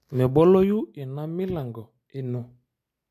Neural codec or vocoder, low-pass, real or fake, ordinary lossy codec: none; 14.4 kHz; real; AAC, 64 kbps